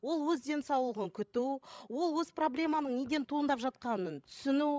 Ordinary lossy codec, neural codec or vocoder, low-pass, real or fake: none; codec, 16 kHz, 16 kbps, FreqCodec, larger model; none; fake